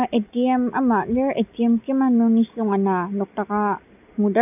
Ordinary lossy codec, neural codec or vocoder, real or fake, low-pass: none; none; real; 3.6 kHz